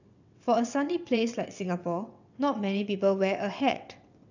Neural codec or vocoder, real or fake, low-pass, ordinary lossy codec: vocoder, 22.05 kHz, 80 mel bands, WaveNeXt; fake; 7.2 kHz; none